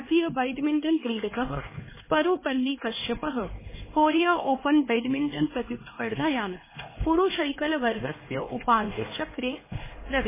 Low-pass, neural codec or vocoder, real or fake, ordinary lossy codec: 3.6 kHz; codec, 16 kHz, 2 kbps, X-Codec, HuBERT features, trained on LibriSpeech; fake; MP3, 16 kbps